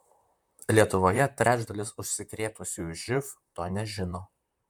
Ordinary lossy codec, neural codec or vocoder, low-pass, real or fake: MP3, 96 kbps; vocoder, 44.1 kHz, 128 mel bands, Pupu-Vocoder; 19.8 kHz; fake